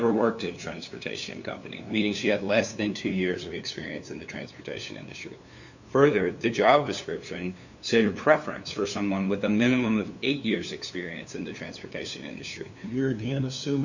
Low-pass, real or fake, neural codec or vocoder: 7.2 kHz; fake; codec, 16 kHz, 2 kbps, FunCodec, trained on LibriTTS, 25 frames a second